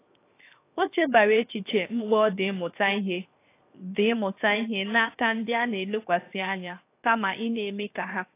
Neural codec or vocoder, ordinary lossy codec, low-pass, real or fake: codec, 16 kHz, 0.7 kbps, FocalCodec; AAC, 24 kbps; 3.6 kHz; fake